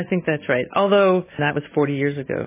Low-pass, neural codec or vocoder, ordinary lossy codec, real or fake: 3.6 kHz; none; MP3, 16 kbps; real